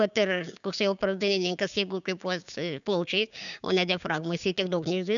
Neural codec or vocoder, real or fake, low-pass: codec, 16 kHz, 6 kbps, DAC; fake; 7.2 kHz